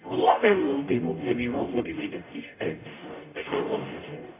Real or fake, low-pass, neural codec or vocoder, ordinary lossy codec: fake; 3.6 kHz; codec, 44.1 kHz, 0.9 kbps, DAC; AAC, 24 kbps